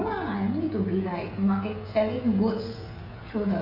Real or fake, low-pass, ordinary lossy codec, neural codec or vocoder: fake; 5.4 kHz; none; codec, 16 kHz, 16 kbps, FreqCodec, smaller model